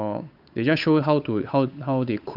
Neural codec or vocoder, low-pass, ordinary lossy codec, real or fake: codec, 16 kHz, 8 kbps, FunCodec, trained on Chinese and English, 25 frames a second; 5.4 kHz; AAC, 48 kbps; fake